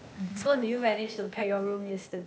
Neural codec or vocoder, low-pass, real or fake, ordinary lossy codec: codec, 16 kHz, 0.8 kbps, ZipCodec; none; fake; none